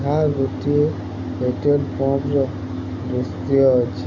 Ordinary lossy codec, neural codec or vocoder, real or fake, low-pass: none; none; real; 7.2 kHz